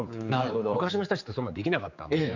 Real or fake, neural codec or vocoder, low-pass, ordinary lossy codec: fake; codec, 16 kHz, 4 kbps, X-Codec, HuBERT features, trained on general audio; 7.2 kHz; none